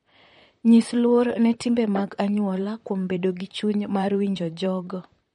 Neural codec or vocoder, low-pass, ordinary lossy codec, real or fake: vocoder, 44.1 kHz, 128 mel bands, Pupu-Vocoder; 19.8 kHz; MP3, 48 kbps; fake